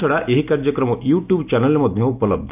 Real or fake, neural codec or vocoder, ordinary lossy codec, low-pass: real; none; none; 3.6 kHz